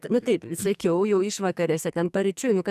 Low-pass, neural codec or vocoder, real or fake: 14.4 kHz; codec, 44.1 kHz, 2.6 kbps, SNAC; fake